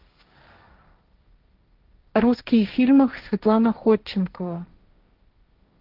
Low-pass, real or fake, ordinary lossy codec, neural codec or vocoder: 5.4 kHz; fake; Opus, 24 kbps; codec, 16 kHz, 1.1 kbps, Voila-Tokenizer